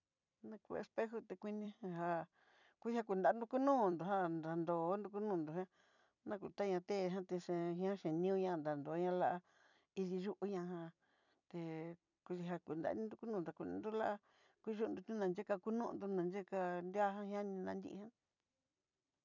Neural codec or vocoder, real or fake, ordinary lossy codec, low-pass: vocoder, 44.1 kHz, 128 mel bands every 256 samples, BigVGAN v2; fake; none; 7.2 kHz